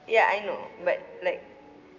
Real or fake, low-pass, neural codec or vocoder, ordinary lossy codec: real; 7.2 kHz; none; none